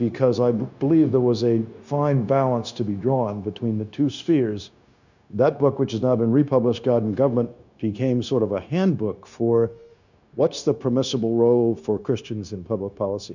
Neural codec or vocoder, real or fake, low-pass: codec, 16 kHz, 0.9 kbps, LongCat-Audio-Codec; fake; 7.2 kHz